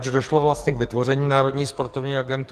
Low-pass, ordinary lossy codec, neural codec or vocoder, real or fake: 14.4 kHz; Opus, 32 kbps; codec, 44.1 kHz, 2.6 kbps, SNAC; fake